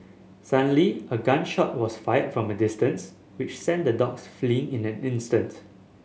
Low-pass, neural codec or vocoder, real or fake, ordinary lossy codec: none; none; real; none